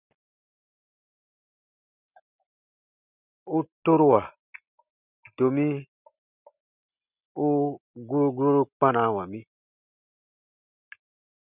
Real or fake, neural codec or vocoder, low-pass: real; none; 3.6 kHz